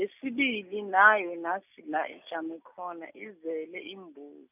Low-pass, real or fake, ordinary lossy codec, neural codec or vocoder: 3.6 kHz; real; none; none